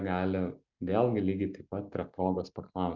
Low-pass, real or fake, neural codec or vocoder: 7.2 kHz; real; none